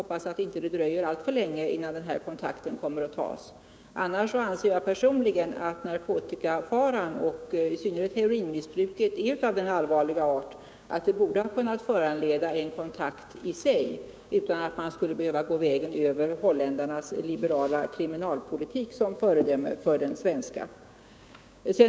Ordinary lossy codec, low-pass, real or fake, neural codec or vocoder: none; none; fake; codec, 16 kHz, 6 kbps, DAC